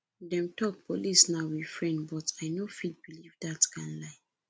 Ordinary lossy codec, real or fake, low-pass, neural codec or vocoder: none; real; none; none